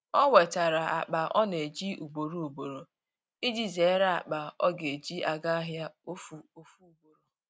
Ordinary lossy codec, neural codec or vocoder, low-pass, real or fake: none; none; none; real